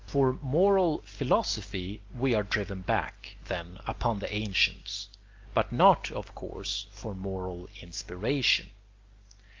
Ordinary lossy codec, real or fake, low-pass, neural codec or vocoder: Opus, 32 kbps; real; 7.2 kHz; none